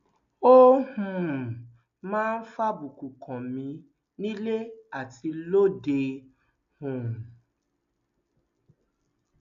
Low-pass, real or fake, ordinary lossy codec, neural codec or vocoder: 7.2 kHz; real; MP3, 64 kbps; none